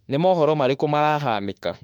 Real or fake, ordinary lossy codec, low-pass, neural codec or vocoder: fake; MP3, 96 kbps; 19.8 kHz; autoencoder, 48 kHz, 32 numbers a frame, DAC-VAE, trained on Japanese speech